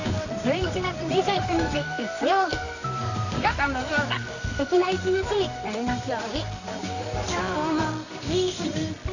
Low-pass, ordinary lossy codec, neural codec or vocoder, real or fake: 7.2 kHz; none; codec, 24 kHz, 0.9 kbps, WavTokenizer, medium music audio release; fake